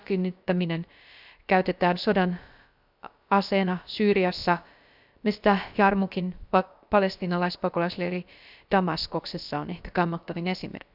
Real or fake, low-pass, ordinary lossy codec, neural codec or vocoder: fake; 5.4 kHz; none; codec, 16 kHz, about 1 kbps, DyCAST, with the encoder's durations